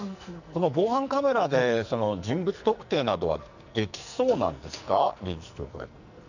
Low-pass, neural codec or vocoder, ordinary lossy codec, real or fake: 7.2 kHz; codec, 44.1 kHz, 2.6 kbps, SNAC; none; fake